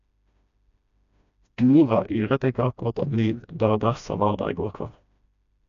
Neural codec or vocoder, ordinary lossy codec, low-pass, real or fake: codec, 16 kHz, 1 kbps, FreqCodec, smaller model; none; 7.2 kHz; fake